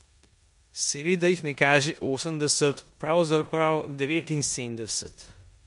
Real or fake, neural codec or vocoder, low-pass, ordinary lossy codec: fake; codec, 16 kHz in and 24 kHz out, 0.9 kbps, LongCat-Audio-Codec, four codebook decoder; 10.8 kHz; MP3, 64 kbps